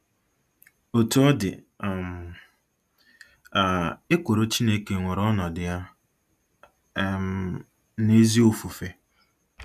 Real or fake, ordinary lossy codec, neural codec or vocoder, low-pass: real; none; none; 14.4 kHz